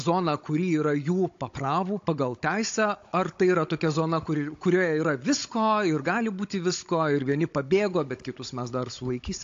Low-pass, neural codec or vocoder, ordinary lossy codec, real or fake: 7.2 kHz; codec, 16 kHz, 16 kbps, FunCodec, trained on Chinese and English, 50 frames a second; AAC, 48 kbps; fake